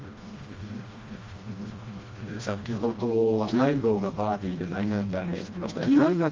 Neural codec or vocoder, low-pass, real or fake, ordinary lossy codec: codec, 16 kHz, 1 kbps, FreqCodec, smaller model; 7.2 kHz; fake; Opus, 32 kbps